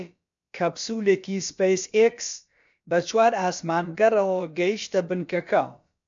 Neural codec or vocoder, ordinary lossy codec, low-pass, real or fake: codec, 16 kHz, about 1 kbps, DyCAST, with the encoder's durations; MP3, 64 kbps; 7.2 kHz; fake